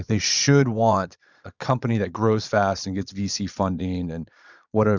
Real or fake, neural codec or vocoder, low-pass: fake; vocoder, 22.05 kHz, 80 mel bands, Vocos; 7.2 kHz